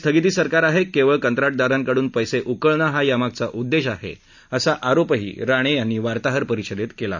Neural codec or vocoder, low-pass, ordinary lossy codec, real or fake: none; 7.2 kHz; none; real